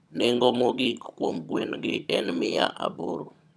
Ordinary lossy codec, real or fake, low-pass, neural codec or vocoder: none; fake; none; vocoder, 22.05 kHz, 80 mel bands, HiFi-GAN